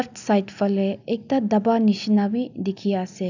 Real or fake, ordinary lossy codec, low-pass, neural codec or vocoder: real; none; 7.2 kHz; none